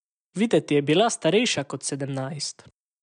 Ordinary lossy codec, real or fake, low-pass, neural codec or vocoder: none; real; 9.9 kHz; none